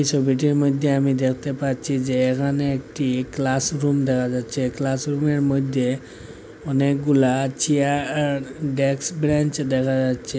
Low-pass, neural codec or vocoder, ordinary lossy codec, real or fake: none; none; none; real